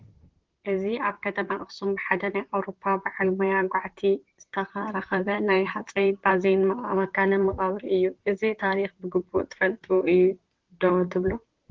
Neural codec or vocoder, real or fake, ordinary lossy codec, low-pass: vocoder, 44.1 kHz, 128 mel bands, Pupu-Vocoder; fake; Opus, 16 kbps; 7.2 kHz